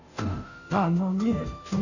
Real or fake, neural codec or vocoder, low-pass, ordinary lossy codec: fake; codec, 16 kHz, 0.5 kbps, FunCodec, trained on Chinese and English, 25 frames a second; 7.2 kHz; none